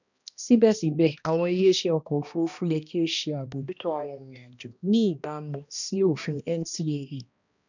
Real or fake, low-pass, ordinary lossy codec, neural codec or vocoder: fake; 7.2 kHz; none; codec, 16 kHz, 1 kbps, X-Codec, HuBERT features, trained on balanced general audio